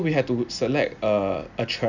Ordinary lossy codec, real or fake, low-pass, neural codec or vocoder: none; real; 7.2 kHz; none